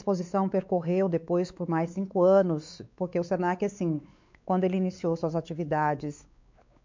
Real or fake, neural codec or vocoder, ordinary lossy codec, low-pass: fake; codec, 16 kHz, 4 kbps, X-Codec, WavLM features, trained on Multilingual LibriSpeech; MP3, 64 kbps; 7.2 kHz